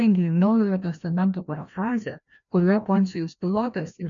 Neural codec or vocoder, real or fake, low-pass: codec, 16 kHz, 1 kbps, FreqCodec, larger model; fake; 7.2 kHz